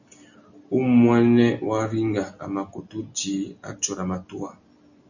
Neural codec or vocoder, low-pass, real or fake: none; 7.2 kHz; real